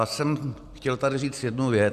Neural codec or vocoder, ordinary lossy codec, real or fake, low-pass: none; Opus, 64 kbps; real; 14.4 kHz